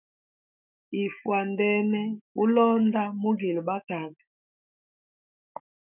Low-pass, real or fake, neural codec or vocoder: 3.6 kHz; fake; autoencoder, 48 kHz, 128 numbers a frame, DAC-VAE, trained on Japanese speech